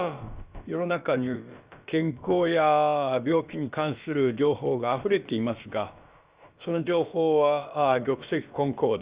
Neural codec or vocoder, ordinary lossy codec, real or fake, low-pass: codec, 16 kHz, about 1 kbps, DyCAST, with the encoder's durations; Opus, 64 kbps; fake; 3.6 kHz